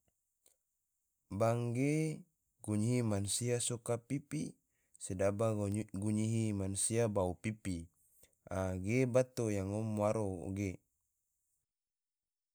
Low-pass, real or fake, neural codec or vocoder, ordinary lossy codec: none; real; none; none